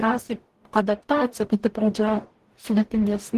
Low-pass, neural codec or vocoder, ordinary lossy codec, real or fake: 14.4 kHz; codec, 44.1 kHz, 0.9 kbps, DAC; Opus, 16 kbps; fake